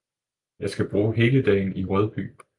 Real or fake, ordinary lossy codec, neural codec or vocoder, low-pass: real; Opus, 32 kbps; none; 10.8 kHz